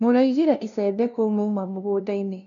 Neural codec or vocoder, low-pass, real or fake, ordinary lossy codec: codec, 16 kHz, 0.5 kbps, FunCodec, trained on LibriTTS, 25 frames a second; 7.2 kHz; fake; none